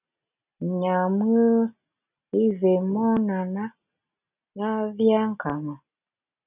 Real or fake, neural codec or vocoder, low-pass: real; none; 3.6 kHz